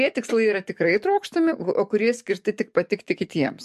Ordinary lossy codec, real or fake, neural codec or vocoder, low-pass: MP3, 64 kbps; fake; codec, 44.1 kHz, 7.8 kbps, DAC; 14.4 kHz